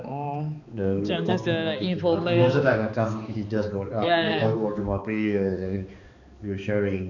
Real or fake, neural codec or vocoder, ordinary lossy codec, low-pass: fake; codec, 16 kHz, 2 kbps, X-Codec, HuBERT features, trained on balanced general audio; none; 7.2 kHz